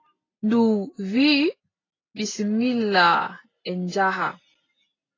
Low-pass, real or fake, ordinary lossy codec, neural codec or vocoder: 7.2 kHz; real; AAC, 32 kbps; none